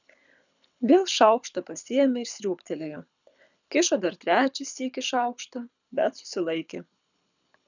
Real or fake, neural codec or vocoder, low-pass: fake; codec, 24 kHz, 6 kbps, HILCodec; 7.2 kHz